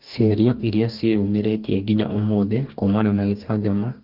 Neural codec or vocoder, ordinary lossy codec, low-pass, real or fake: codec, 44.1 kHz, 2.6 kbps, DAC; Opus, 32 kbps; 5.4 kHz; fake